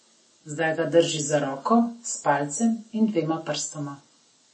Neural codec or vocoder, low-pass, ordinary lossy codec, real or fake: none; 9.9 kHz; MP3, 32 kbps; real